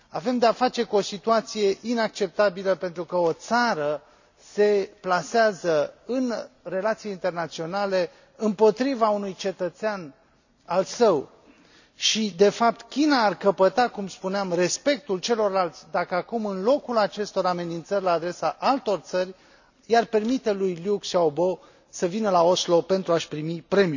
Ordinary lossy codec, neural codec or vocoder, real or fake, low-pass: none; none; real; 7.2 kHz